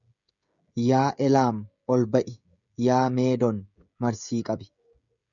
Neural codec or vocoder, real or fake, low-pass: codec, 16 kHz, 16 kbps, FreqCodec, smaller model; fake; 7.2 kHz